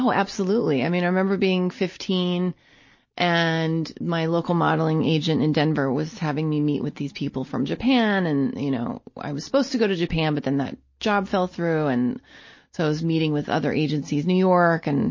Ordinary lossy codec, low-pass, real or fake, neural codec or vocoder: MP3, 32 kbps; 7.2 kHz; real; none